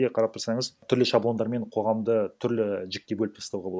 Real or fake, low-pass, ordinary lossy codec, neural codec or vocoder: real; none; none; none